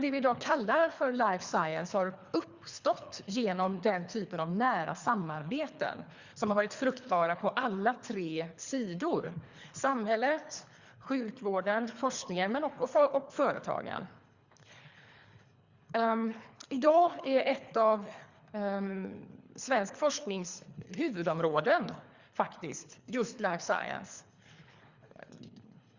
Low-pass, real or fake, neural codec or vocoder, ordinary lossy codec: 7.2 kHz; fake; codec, 24 kHz, 3 kbps, HILCodec; Opus, 64 kbps